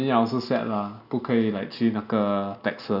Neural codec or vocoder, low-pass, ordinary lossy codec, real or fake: none; 5.4 kHz; none; real